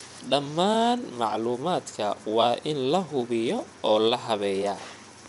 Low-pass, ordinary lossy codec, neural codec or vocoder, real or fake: 10.8 kHz; none; vocoder, 24 kHz, 100 mel bands, Vocos; fake